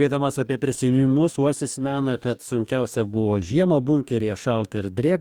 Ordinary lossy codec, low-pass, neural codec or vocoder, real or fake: Opus, 64 kbps; 19.8 kHz; codec, 44.1 kHz, 2.6 kbps, DAC; fake